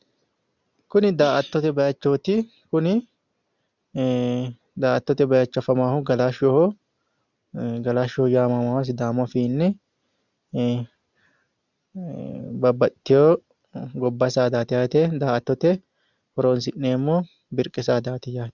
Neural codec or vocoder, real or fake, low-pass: none; real; 7.2 kHz